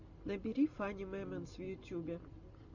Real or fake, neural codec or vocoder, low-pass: real; none; 7.2 kHz